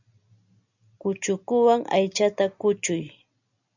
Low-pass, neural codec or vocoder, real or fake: 7.2 kHz; none; real